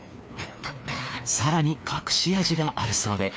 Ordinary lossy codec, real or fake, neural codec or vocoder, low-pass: none; fake; codec, 16 kHz, 2 kbps, FreqCodec, larger model; none